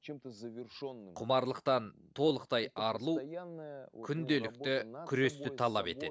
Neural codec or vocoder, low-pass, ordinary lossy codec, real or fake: none; none; none; real